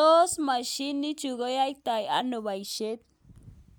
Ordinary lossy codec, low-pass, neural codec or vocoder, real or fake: none; none; none; real